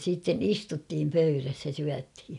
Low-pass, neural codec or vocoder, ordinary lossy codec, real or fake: 10.8 kHz; none; none; real